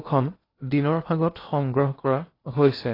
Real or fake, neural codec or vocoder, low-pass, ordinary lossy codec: fake; codec, 16 kHz in and 24 kHz out, 0.8 kbps, FocalCodec, streaming, 65536 codes; 5.4 kHz; AAC, 24 kbps